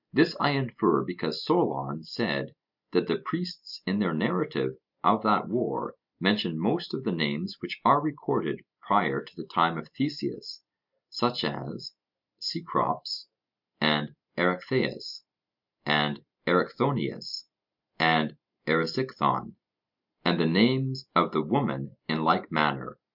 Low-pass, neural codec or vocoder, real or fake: 5.4 kHz; none; real